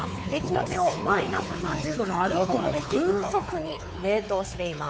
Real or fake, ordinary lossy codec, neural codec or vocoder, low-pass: fake; none; codec, 16 kHz, 4 kbps, X-Codec, WavLM features, trained on Multilingual LibriSpeech; none